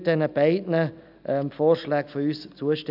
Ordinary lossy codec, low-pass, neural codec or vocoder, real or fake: none; 5.4 kHz; none; real